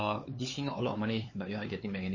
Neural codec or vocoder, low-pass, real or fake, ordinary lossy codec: codec, 16 kHz, 4 kbps, X-Codec, HuBERT features, trained on LibriSpeech; 7.2 kHz; fake; MP3, 32 kbps